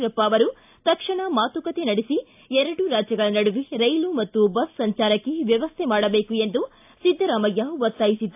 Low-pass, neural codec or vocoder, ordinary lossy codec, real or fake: 3.6 kHz; none; AAC, 32 kbps; real